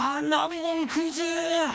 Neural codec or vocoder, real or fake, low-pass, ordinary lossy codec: codec, 16 kHz, 1 kbps, FreqCodec, larger model; fake; none; none